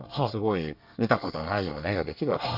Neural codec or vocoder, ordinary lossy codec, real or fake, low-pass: codec, 24 kHz, 1 kbps, SNAC; none; fake; 5.4 kHz